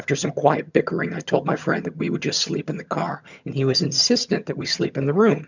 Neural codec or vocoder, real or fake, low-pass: vocoder, 22.05 kHz, 80 mel bands, HiFi-GAN; fake; 7.2 kHz